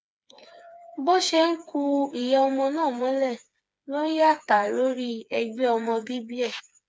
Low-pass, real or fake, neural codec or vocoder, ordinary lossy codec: none; fake; codec, 16 kHz, 4 kbps, FreqCodec, smaller model; none